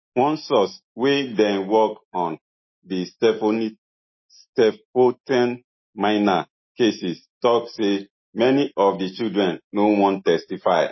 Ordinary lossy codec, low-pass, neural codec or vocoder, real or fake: MP3, 24 kbps; 7.2 kHz; none; real